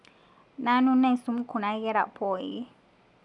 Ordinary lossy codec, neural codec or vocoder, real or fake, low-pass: none; none; real; 10.8 kHz